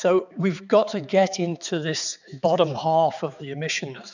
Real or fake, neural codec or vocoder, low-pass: fake; codec, 16 kHz, 4 kbps, X-Codec, HuBERT features, trained on balanced general audio; 7.2 kHz